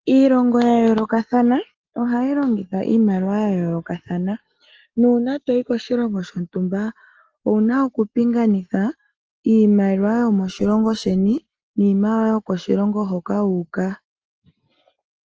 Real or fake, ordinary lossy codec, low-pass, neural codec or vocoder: real; Opus, 16 kbps; 7.2 kHz; none